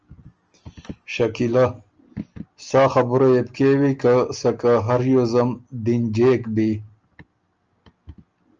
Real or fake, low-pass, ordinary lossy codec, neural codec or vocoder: real; 7.2 kHz; Opus, 24 kbps; none